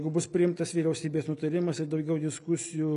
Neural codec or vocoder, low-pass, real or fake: none; 10.8 kHz; real